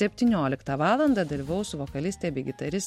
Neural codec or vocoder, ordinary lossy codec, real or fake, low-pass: none; MP3, 64 kbps; real; 19.8 kHz